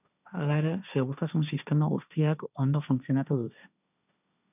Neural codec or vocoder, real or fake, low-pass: codec, 16 kHz, 1.1 kbps, Voila-Tokenizer; fake; 3.6 kHz